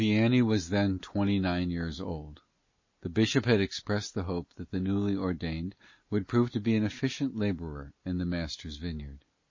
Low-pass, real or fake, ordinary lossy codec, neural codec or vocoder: 7.2 kHz; real; MP3, 32 kbps; none